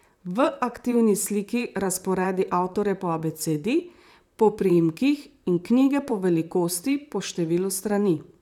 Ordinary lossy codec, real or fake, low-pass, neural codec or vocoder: none; fake; 19.8 kHz; vocoder, 44.1 kHz, 128 mel bands, Pupu-Vocoder